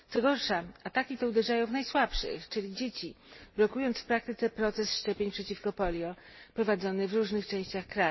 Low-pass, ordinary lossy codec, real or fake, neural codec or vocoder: 7.2 kHz; MP3, 24 kbps; real; none